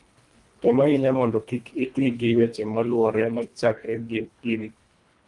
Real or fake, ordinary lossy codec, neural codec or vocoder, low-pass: fake; Opus, 32 kbps; codec, 24 kHz, 1.5 kbps, HILCodec; 10.8 kHz